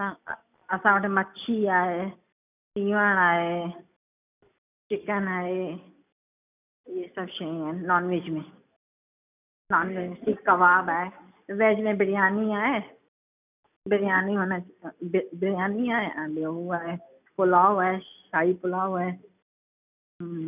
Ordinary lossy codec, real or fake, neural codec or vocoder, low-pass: none; real; none; 3.6 kHz